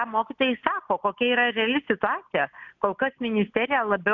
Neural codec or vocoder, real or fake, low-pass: none; real; 7.2 kHz